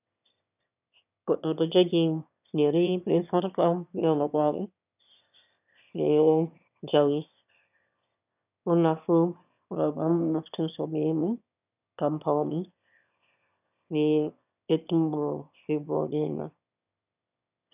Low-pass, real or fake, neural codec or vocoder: 3.6 kHz; fake; autoencoder, 22.05 kHz, a latent of 192 numbers a frame, VITS, trained on one speaker